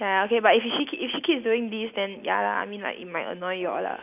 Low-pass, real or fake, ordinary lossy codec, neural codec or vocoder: 3.6 kHz; real; none; none